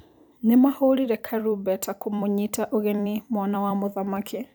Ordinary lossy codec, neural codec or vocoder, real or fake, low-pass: none; vocoder, 44.1 kHz, 128 mel bands every 256 samples, BigVGAN v2; fake; none